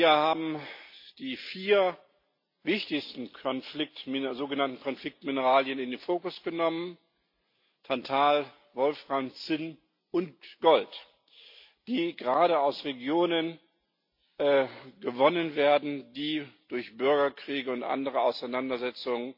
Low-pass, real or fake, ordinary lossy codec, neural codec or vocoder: 5.4 kHz; real; MP3, 32 kbps; none